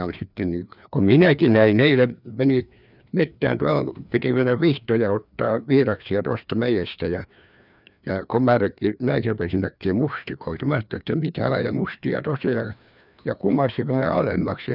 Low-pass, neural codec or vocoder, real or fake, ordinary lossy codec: 5.4 kHz; codec, 16 kHz, 2 kbps, FreqCodec, larger model; fake; none